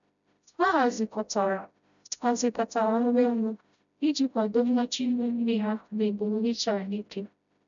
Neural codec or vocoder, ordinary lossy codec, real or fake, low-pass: codec, 16 kHz, 0.5 kbps, FreqCodec, smaller model; none; fake; 7.2 kHz